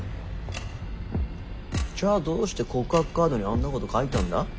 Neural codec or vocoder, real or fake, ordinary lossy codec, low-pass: none; real; none; none